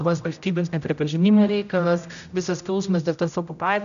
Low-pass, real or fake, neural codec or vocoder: 7.2 kHz; fake; codec, 16 kHz, 0.5 kbps, X-Codec, HuBERT features, trained on general audio